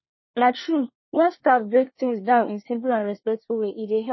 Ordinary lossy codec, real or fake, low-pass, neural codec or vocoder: MP3, 24 kbps; fake; 7.2 kHz; codec, 24 kHz, 1 kbps, SNAC